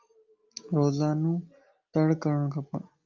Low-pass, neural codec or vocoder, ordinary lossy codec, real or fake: 7.2 kHz; none; Opus, 24 kbps; real